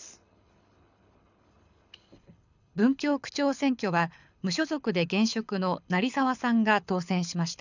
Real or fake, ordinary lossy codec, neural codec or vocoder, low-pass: fake; none; codec, 24 kHz, 6 kbps, HILCodec; 7.2 kHz